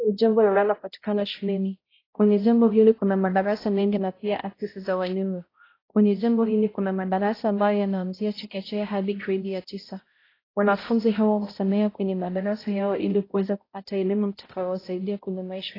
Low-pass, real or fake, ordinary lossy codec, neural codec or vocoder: 5.4 kHz; fake; AAC, 24 kbps; codec, 16 kHz, 0.5 kbps, X-Codec, HuBERT features, trained on balanced general audio